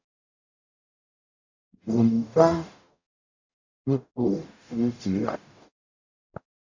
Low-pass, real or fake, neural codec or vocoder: 7.2 kHz; fake; codec, 44.1 kHz, 0.9 kbps, DAC